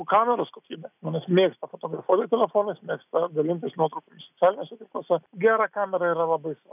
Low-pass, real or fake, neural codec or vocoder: 3.6 kHz; real; none